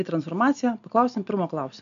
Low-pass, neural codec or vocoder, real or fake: 7.2 kHz; none; real